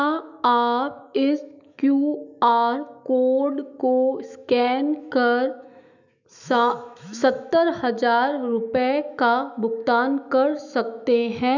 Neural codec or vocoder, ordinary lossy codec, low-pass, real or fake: autoencoder, 48 kHz, 128 numbers a frame, DAC-VAE, trained on Japanese speech; none; 7.2 kHz; fake